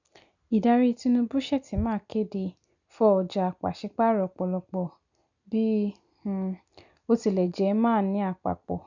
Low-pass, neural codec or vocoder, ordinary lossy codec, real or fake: 7.2 kHz; none; none; real